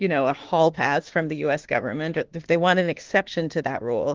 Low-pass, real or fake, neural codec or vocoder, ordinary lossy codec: 7.2 kHz; fake; autoencoder, 48 kHz, 32 numbers a frame, DAC-VAE, trained on Japanese speech; Opus, 16 kbps